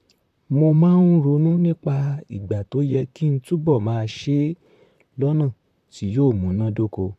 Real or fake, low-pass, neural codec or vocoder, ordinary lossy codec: fake; 14.4 kHz; vocoder, 44.1 kHz, 128 mel bands, Pupu-Vocoder; AAC, 96 kbps